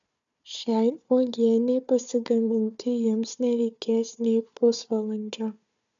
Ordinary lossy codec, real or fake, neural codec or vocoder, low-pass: MP3, 96 kbps; fake; codec, 16 kHz, 4 kbps, FunCodec, trained on Chinese and English, 50 frames a second; 7.2 kHz